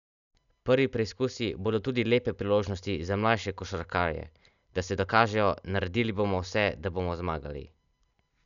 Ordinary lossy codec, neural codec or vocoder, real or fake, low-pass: none; none; real; 7.2 kHz